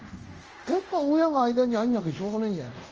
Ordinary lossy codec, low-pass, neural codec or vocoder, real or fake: Opus, 24 kbps; 7.2 kHz; codec, 24 kHz, 0.5 kbps, DualCodec; fake